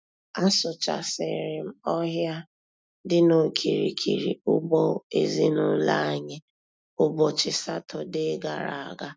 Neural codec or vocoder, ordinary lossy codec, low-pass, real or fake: none; none; none; real